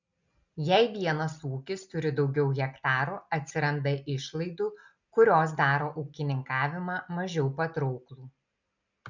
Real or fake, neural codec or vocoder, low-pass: real; none; 7.2 kHz